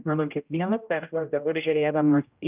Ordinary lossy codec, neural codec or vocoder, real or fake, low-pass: Opus, 24 kbps; codec, 16 kHz, 0.5 kbps, X-Codec, HuBERT features, trained on general audio; fake; 3.6 kHz